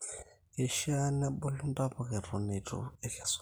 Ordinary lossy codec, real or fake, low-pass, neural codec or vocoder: none; real; none; none